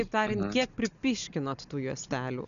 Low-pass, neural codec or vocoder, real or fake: 7.2 kHz; none; real